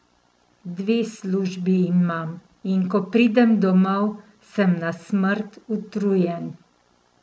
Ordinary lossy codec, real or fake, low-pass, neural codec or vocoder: none; real; none; none